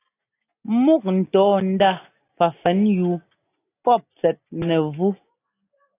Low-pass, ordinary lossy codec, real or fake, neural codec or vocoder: 3.6 kHz; AAC, 24 kbps; real; none